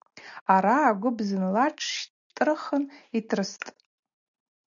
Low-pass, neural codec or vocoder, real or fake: 7.2 kHz; none; real